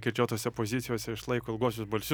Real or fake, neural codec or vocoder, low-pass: real; none; 19.8 kHz